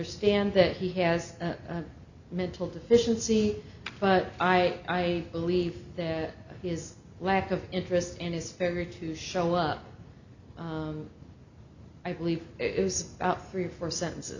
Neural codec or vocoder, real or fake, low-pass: none; real; 7.2 kHz